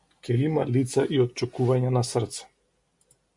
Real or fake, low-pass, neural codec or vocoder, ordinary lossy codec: real; 10.8 kHz; none; MP3, 64 kbps